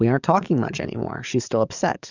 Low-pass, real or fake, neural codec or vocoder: 7.2 kHz; fake; codec, 44.1 kHz, 7.8 kbps, DAC